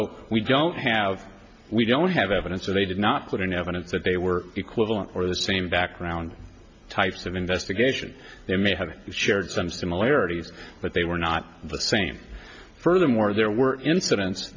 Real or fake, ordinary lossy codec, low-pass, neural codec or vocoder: real; MP3, 48 kbps; 7.2 kHz; none